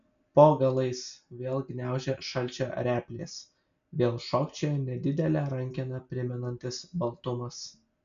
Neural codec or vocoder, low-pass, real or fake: none; 7.2 kHz; real